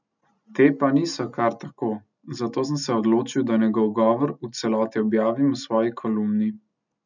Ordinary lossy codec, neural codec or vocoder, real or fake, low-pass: none; none; real; 7.2 kHz